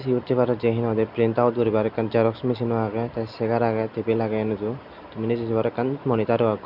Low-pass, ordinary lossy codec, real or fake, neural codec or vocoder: 5.4 kHz; none; real; none